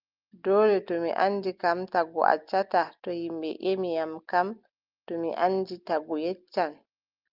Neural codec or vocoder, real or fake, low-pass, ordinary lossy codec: none; real; 5.4 kHz; Opus, 24 kbps